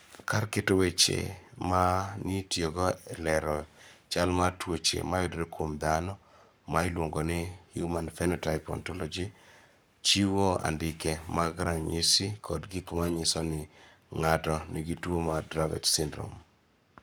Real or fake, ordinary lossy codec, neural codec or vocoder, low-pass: fake; none; codec, 44.1 kHz, 7.8 kbps, Pupu-Codec; none